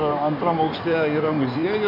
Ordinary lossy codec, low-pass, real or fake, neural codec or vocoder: MP3, 32 kbps; 5.4 kHz; fake; codec, 16 kHz in and 24 kHz out, 2.2 kbps, FireRedTTS-2 codec